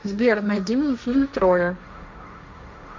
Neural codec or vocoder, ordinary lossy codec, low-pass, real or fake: codec, 16 kHz, 1.1 kbps, Voila-Tokenizer; MP3, 64 kbps; 7.2 kHz; fake